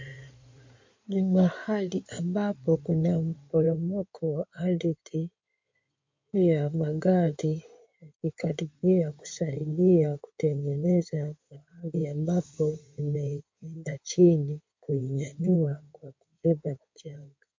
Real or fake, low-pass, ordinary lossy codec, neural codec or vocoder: fake; 7.2 kHz; MP3, 48 kbps; codec, 16 kHz in and 24 kHz out, 2.2 kbps, FireRedTTS-2 codec